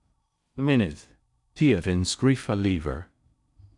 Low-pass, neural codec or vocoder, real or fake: 10.8 kHz; codec, 16 kHz in and 24 kHz out, 0.6 kbps, FocalCodec, streaming, 4096 codes; fake